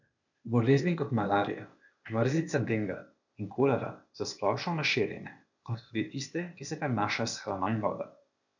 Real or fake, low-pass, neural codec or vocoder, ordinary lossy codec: fake; 7.2 kHz; codec, 16 kHz, 0.8 kbps, ZipCodec; none